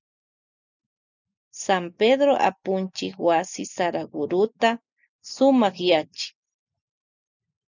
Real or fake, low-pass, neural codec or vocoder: real; 7.2 kHz; none